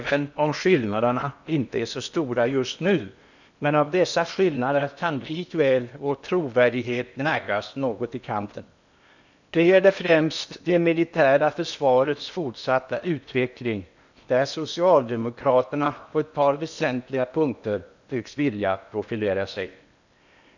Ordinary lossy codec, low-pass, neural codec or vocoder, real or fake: none; 7.2 kHz; codec, 16 kHz in and 24 kHz out, 0.8 kbps, FocalCodec, streaming, 65536 codes; fake